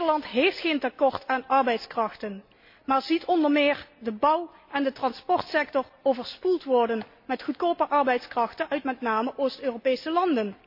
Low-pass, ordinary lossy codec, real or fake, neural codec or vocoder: 5.4 kHz; MP3, 32 kbps; real; none